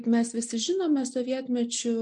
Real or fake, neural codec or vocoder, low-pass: real; none; 10.8 kHz